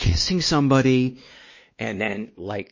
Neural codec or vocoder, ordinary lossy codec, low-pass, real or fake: codec, 16 kHz, 2 kbps, X-Codec, WavLM features, trained on Multilingual LibriSpeech; MP3, 32 kbps; 7.2 kHz; fake